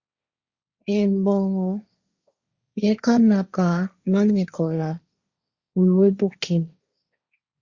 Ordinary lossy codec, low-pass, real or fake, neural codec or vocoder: Opus, 64 kbps; 7.2 kHz; fake; codec, 16 kHz, 1.1 kbps, Voila-Tokenizer